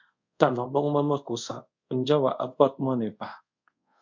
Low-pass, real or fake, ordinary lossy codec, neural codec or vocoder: 7.2 kHz; fake; MP3, 64 kbps; codec, 24 kHz, 0.5 kbps, DualCodec